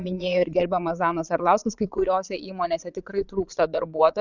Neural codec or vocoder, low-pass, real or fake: vocoder, 22.05 kHz, 80 mel bands, Vocos; 7.2 kHz; fake